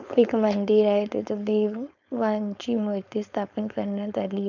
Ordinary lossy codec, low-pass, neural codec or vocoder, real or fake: none; 7.2 kHz; codec, 16 kHz, 4.8 kbps, FACodec; fake